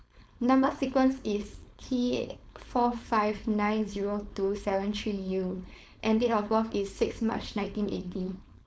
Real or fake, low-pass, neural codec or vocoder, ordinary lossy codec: fake; none; codec, 16 kHz, 4.8 kbps, FACodec; none